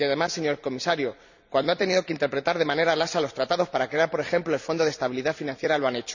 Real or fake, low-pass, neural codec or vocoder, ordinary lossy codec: fake; 7.2 kHz; vocoder, 44.1 kHz, 128 mel bands every 256 samples, BigVGAN v2; none